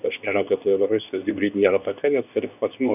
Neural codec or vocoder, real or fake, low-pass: codec, 24 kHz, 0.9 kbps, WavTokenizer, medium speech release version 2; fake; 3.6 kHz